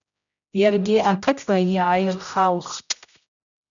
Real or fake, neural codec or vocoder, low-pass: fake; codec, 16 kHz, 0.5 kbps, X-Codec, HuBERT features, trained on general audio; 7.2 kHz